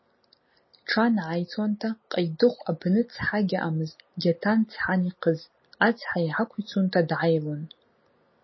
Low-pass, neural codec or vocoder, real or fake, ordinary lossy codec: 7.2 kHz; none; real; MP3, 24 kbps